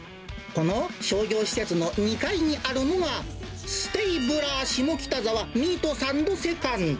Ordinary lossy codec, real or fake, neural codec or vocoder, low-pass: none; real; none; none